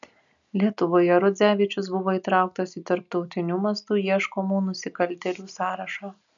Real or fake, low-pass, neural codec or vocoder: real; 7.2 kHz; none